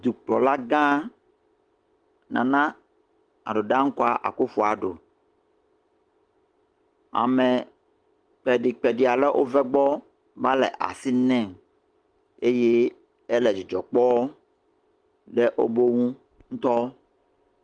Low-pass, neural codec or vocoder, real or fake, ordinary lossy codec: 9.9 kHz; none; real; Opus, 24 kbps